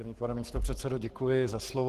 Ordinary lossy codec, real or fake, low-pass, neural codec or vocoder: Opus, 16 kbps; fake; 14.4 kHz; codec, 44.1 kHz, 7.8 kbps, Pupu-Codec